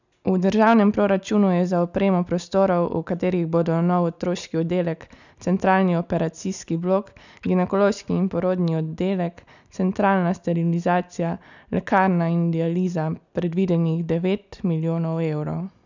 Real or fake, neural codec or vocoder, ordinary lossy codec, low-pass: real; none; none; 7.2 kHz